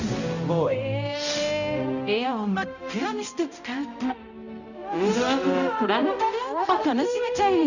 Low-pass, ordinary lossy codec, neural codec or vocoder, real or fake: 7.2 kHz; none; codec, 16 kHz, 0.5 kbps, X-Codec, HuBERT features, trained on balanced general audio; fake